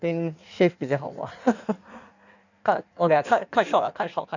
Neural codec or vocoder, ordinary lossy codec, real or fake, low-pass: codec, 16 kHz in and 24 kHz out, 1.1 kbps, FireRedTTS-2 codec; none; fake; 7.2 kHz